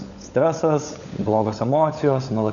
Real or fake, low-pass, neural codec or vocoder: fake; 7.2 kHz; codec, 16 kHz, 16 kbps, FunCodec, trained on LibriTTS, 50 frames a second